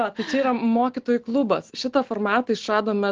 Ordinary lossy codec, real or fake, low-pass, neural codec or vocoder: Opus, 16 kbps; real; 7.2 kHz; none